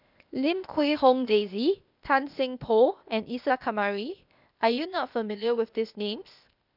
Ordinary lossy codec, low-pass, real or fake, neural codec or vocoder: none; 5.4 kHz; fake; codec, 16 kHz, 0.8 kbps, ZipCodec